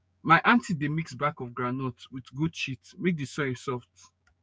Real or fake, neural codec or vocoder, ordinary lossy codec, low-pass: fake; codec, 16 kHz, 6 kbps, DAC; none; none